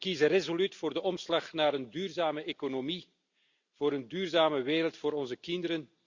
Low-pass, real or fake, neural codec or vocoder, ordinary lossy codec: 7.2 kHz; real; none; Opus, 64 kbps